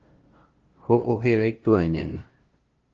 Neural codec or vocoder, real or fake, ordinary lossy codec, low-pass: codec, 16 kHz, 0.5 kbps, FunCodec, trained on LibriTTS, 25 frames a second; fake; Opus, 16 kbps; 7.2 kHz